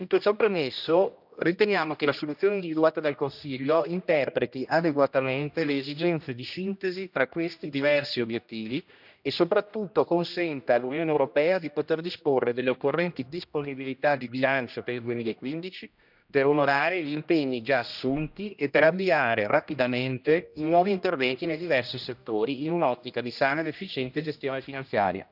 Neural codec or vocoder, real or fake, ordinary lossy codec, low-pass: codec, 16 kHz, 1 kbps, X-Codec, HuBERT features, trained on general audio; fake; none; 5.4 kHz